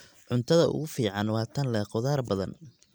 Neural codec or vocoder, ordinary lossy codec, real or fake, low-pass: none; none; real; none